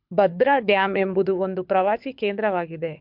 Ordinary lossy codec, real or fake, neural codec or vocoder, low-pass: MP3, 48 kbps; fake; codec, 24 kHz, 6 kbps, HILCodec; 5.4 kHz